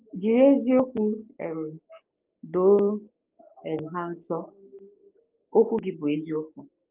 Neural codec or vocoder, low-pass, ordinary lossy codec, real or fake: codec, 16 kHz, 6 kbps, DAC; 3.6 kHz; Opus, 32 kbps; fake